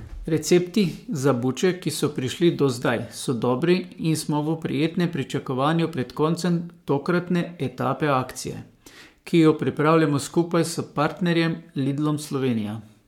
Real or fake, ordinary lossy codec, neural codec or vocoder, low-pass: fake; MP3, 96 kbps; codec, 44.1 kHz, 7.8 kbps, Pupu-Codec; 19.8 kHz